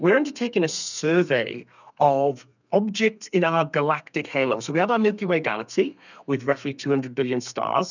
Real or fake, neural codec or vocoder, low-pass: fake; codec, 32 kHz, 1.9 kbps, SNAC; 7.2 kHz